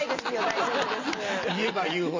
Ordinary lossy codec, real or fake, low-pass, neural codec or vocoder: MP3, 48 kbps; real; 7.2 kHz; none